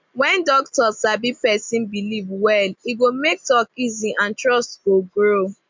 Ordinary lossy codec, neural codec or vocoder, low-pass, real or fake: MP3, 64 kbps; none; 7.2 kHz; real